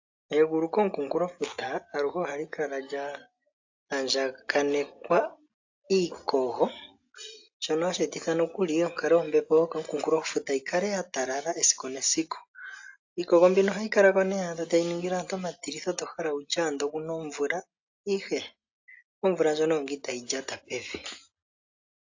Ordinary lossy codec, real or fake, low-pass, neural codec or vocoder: AAC, 48 kbps; real; 7.2 kHz; none